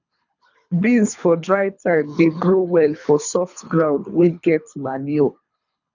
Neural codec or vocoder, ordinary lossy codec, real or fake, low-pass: codec, 24 kHz, 3 kbps, HILCodec; none; fake; 7.2 kHz